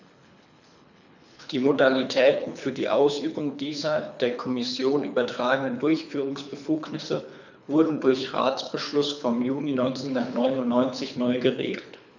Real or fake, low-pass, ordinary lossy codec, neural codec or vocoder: fake; 7.2 kHz; none; codec, 24 kHz, 3 kbps, HILCodec